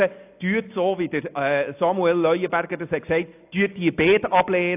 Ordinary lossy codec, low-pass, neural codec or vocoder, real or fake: AAC, 32 kbps; 3.6 kHz; none; real